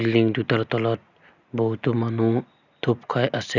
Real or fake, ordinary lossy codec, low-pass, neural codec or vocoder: real; none; 7.2 kHz; none